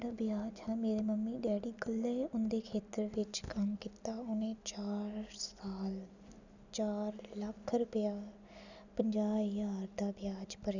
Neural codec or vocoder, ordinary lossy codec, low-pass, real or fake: none; none; 7.2 kHz; real